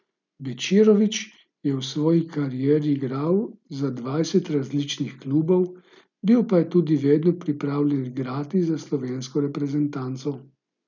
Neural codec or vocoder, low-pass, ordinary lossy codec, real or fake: none; 7.2 kHz; none; real